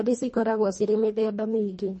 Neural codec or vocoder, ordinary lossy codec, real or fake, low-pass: codec, 24 kHz, 1.5 kbps, HILCodec; MP3, 32 kbps; fake; 10.8 kHz